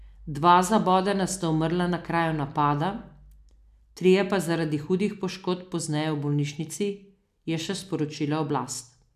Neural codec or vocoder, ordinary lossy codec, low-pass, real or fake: none; none; 14.4 kHz; real